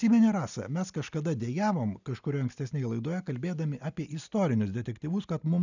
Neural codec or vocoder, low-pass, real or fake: none; 7.2 kHz; real